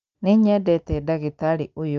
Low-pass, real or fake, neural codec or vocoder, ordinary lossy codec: 7.2 kHz; real; none; Opus, 24 kbps